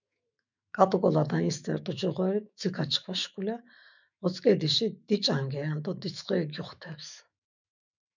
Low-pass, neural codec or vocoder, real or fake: 7.2 kHz; autoencoder, 48 kHz, 128 numbers a frame, DAC-VAE, trained on Japanese speech; fake